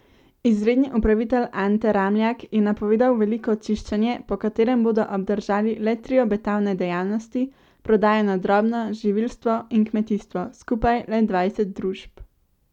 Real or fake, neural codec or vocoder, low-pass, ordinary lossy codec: real; none; 19.8 kHz; none